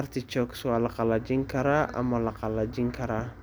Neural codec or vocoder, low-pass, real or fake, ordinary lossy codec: none; none; real; none